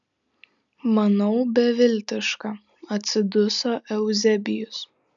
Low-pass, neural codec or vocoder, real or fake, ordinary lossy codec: 7.2 kHz; none; real; MP3, 96 kbps